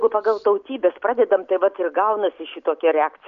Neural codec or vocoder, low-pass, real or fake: none; 7.2 kHz; real